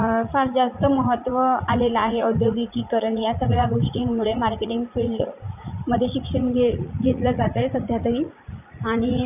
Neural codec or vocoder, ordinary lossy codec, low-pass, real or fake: vocoder, 44.1 kHz, 80 mel bands, Vocos; none; 3.6 kHz; fake